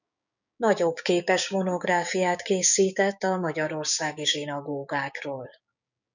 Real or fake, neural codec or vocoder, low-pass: fake; codec, 16 kHz, 6 kbps, DAC; 7.2 kHz